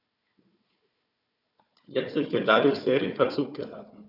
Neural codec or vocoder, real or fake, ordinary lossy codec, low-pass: codec, 16 kHz, 4 kbps, FunCodec, trained on Chinese and English, 50 frames a second; fake; none; 5.4 kHz